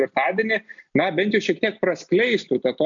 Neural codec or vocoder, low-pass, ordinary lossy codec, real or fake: none; 7.2 kHz; AAC, 64 kbps; real